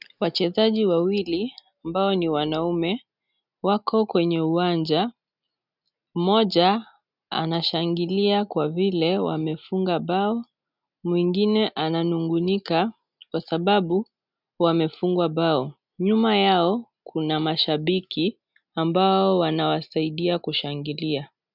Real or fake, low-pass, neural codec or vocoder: real; 5.4 kHz; none